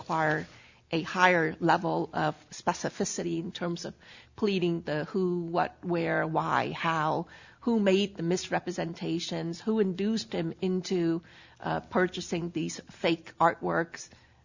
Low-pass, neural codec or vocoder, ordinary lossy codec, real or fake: 7.2 kHz; none; Opus, 64 kbps; real